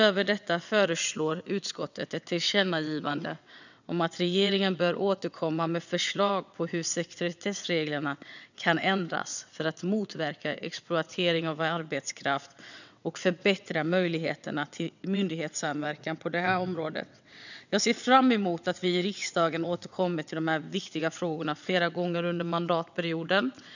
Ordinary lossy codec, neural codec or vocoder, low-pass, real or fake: none; vocoder, 22.05 kHz, 80 mel bands, WaveNeXt; 7.2 kHz; fake